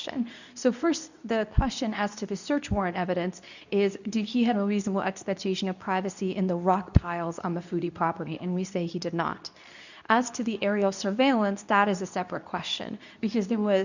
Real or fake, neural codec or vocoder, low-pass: fake; codec, 24 kHz, 0.9 kbps, WavTokenizer, medium speech release version 2; 7.2 kHz